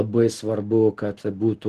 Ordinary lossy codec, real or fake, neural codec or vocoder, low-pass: Opus, 16 kbps; real; none; 14.4 kHz